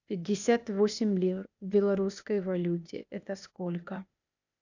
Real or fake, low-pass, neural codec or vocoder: fake; 7.2 kHz; codec, 16 kHz, 0.8 kbps, ZipCodec